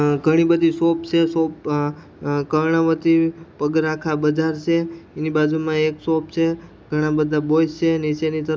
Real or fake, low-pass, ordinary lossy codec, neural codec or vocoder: real; none; none; none